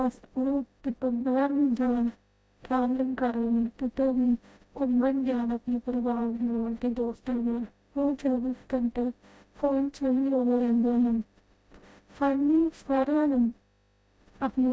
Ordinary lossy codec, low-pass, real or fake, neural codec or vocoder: none; none; fake; codec, 16 kHz, 0.5 kbps, FreqCodec, smaller model